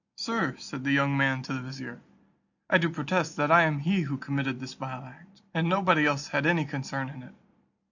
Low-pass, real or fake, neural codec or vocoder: 7.2 kHz; real; none